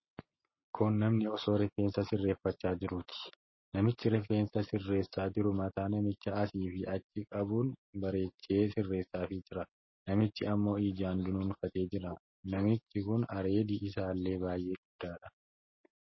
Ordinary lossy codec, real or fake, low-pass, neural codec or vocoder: MP3, 24 kbps; real; 7.2 kHz; none